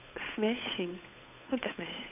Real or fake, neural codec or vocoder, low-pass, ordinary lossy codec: fake; codec, 16 kHz, 8 kbps, FunCodec, trained on Chinese and English, 25 frames a second; 3.6 kHz; none